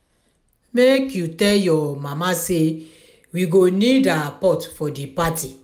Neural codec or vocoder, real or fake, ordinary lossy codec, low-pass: none; real; none; 19.8 kHz